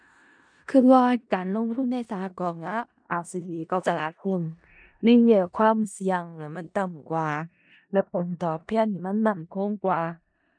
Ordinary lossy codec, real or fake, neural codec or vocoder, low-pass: MP3, 96 kbps; fake; codec, 16 kHz in and 24 kHz out, 0.4 kbps, LongCat-Audio-Codec, four codebook decoder; 9.9 kHz